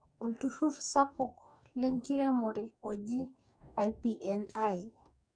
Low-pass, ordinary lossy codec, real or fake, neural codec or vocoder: 9.9 kHz; none; fake; codec, 44.1 kHz, 2.6 kbps, DAC